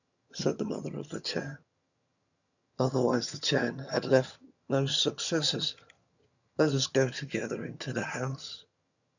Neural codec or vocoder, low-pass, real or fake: vocoder, 22.05 kHz, 80 mel bands, HiFi-GAN; 7.2 kHz; fake